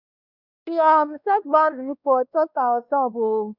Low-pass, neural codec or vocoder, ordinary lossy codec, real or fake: 5.4 kHz; codec, 16 kHz, 1 kbps, X-Codec, WavLM features, trained on Multilingual LibriSpeech; none; fake